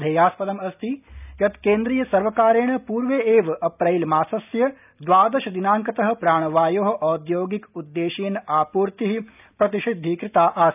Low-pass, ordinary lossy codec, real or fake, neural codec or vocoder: 3.6 kHz; none; real; none